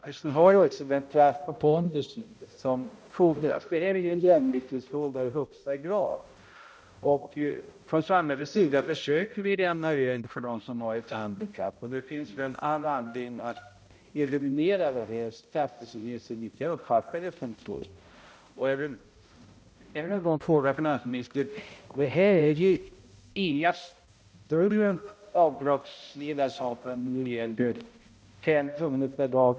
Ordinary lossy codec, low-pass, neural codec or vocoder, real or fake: none; none; codec, 16 kHz, 0.5 kbps, X-Codec, HuBERT features, trained on balanced general audio; fake